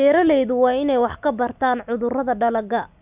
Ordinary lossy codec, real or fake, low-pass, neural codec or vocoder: Opus, 64 kbps; real; 3.6 kHz; none